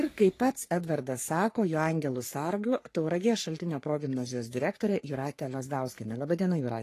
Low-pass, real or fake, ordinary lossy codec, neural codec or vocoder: 14.4 kHz; fake; AAC, 48 kbps; codec, 44.1 kHz, 3.4 kbps, Pupu-Codec